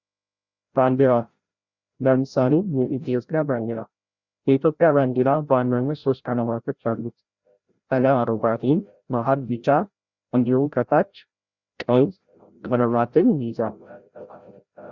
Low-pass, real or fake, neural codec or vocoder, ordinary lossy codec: 7.2 kHz; fake; codec, 16 kHz, 0.5 kbps, FreqCodec, larger model; Opus, 64 kbps